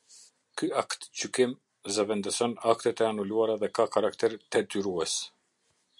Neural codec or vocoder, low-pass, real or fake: none; 10.8 kHz; real